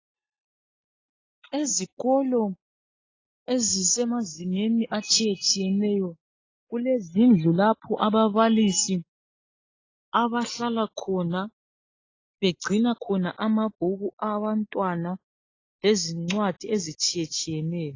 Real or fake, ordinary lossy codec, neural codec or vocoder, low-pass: real; AAC, 32 kbps; none; 7.2 kHz